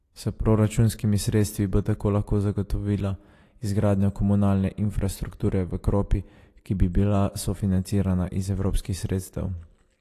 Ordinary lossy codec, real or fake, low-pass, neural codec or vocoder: AAC, 48 kbps; real; 14.4 kHz; none